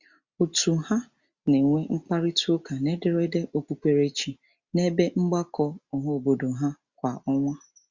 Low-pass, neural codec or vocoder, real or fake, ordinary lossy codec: 7.2 kHz; none; real; Opus, 64 kbps